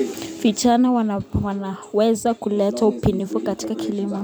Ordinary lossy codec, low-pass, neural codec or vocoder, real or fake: none; none; vocoder, 44.1 kHz, 128 mel bands every 256 samples, BigVGAN v2; fake